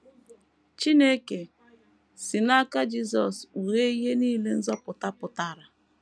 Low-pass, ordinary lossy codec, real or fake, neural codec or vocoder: none; none; real; none